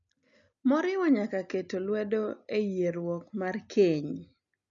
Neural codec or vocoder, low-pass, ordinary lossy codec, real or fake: none; 7.2 kHz; none; real